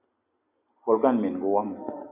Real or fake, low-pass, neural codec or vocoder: real; 3.6 kHz; none